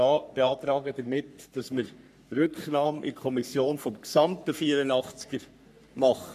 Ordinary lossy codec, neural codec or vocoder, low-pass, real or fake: MP3, 96 kbps; codec, 44.1 kHz, 3.4 kbps, Pupu-Codec; 14.4 kHz; fake